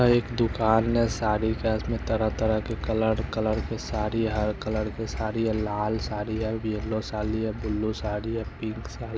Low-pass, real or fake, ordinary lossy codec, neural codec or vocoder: none; real; none; none